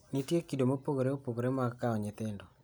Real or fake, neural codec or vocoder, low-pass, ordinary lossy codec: real; none; none; none